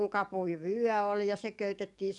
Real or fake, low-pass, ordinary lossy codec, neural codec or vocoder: fake; 10.8 kHz; none; codec, 44.1 kHz, 7.8 kbps, DAC